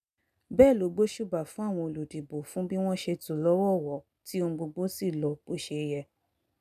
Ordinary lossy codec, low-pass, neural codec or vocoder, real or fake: none; 14.4 kHz; none; real